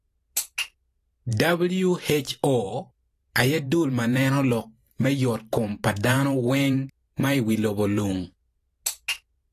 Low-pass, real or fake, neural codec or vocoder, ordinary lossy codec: 14.4 kHz; fake; vocoder, 44.1 kHz, 128 mel bands every 512 samples, BigVGAN v2; AAC, 48 kbps